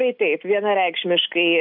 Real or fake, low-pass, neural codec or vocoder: real; 5.4 kHz; none